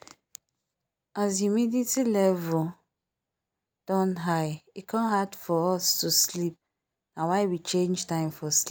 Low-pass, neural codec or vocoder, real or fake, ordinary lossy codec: none; none; real; none